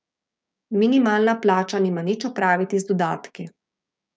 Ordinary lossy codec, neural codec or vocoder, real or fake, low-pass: none; codec, 16 kHz, 6 kbps, DAC; fake; none